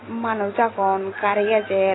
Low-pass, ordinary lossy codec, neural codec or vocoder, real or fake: 7.2 kHz; AAC, 16 kbps; none; real